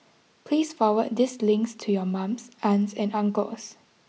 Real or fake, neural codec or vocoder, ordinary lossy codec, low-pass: real; none; none; none